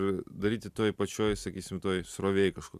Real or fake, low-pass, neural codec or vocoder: real; 14.4 kHz; none